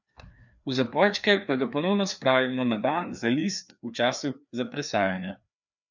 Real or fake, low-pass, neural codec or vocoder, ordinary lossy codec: fake; 7.2 kHz; codec, 16 kHz, 2 kbps, FreqCodec, larger model; none